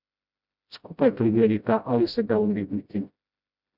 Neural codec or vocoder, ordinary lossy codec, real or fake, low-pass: codec, 16 kHz, 0.5 kbps, FreqCodec, smaller model; none; fake; 5.4 kHz